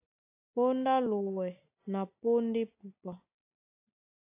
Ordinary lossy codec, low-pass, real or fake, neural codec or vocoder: AAC, 24 kbps; 3.6 kHz; real; none